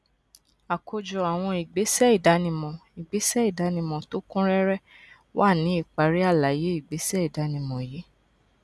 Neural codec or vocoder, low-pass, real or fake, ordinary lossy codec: none; none; real; none